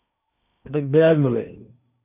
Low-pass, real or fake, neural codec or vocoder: 3.6 kHz; fake; codec, 16 kHz in and 24 kHz out, 0.8 kbps, FocalCodec, streaming, 65536 codes